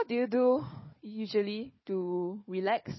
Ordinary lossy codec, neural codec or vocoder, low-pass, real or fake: MP3, 24 kbps; codec, 16 kHz, 4 kbps, FunCodec, trained on Chinese and English, 50 frames a second; 7.2 kHz; fake